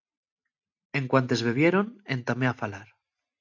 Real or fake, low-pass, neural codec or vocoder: real; 7.2 kHz; none